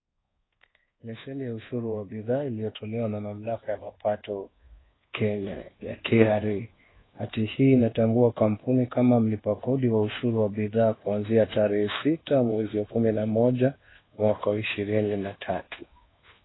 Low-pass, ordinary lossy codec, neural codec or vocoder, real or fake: 7.2 kHz; AAC, 16 kbps; codec, 24 kHz, 1.2 kbps, DualCodec; fake